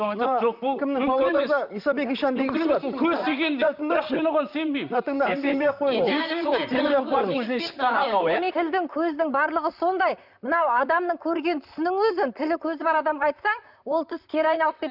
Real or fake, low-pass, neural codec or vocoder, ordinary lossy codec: fake; 5.4 kHz; vocoder, 44.1 kHz, 128 mel bands, Pupu-Vocoder; none